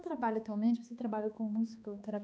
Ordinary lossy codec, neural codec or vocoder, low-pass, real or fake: none; codec, 16 kHz, 2 kbps, X-Codec, HuBERT features, trained on balanced general audio; none; fake